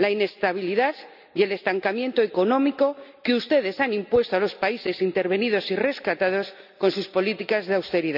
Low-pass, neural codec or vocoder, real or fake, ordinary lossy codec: 5.4 kHz; none; real; none